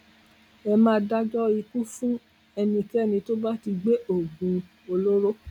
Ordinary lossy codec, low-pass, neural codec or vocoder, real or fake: none; 19.8 kHz; none; real